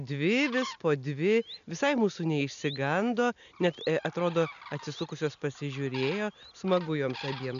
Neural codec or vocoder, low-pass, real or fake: none; 7.2 kHz; real